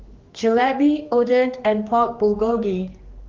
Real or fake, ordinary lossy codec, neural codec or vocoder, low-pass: fake; Opus, 32 kbps; codec, 16 kHz, 2 kbps, X-Codec, HuBERT features, trained on general audio; 7.2 kHz